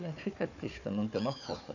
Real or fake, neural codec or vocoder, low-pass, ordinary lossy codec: fake; codec, 16 kHz, 4 kbps, FunCodec, trained on Chinese and English, 50 frames a second; 7.2 kHz; none